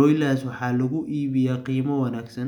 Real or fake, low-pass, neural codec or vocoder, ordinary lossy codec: real; 19.8 kHz; none; none